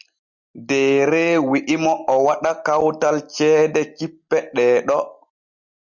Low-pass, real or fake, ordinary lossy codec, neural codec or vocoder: 7.2 kHz; real; Opus, 64 kbps; none